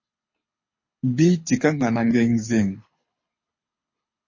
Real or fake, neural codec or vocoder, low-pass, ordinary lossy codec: fake; codec, 24 kHz, 6 kbps, HILCodec; 7.2 kHz; MP3, 32 kbps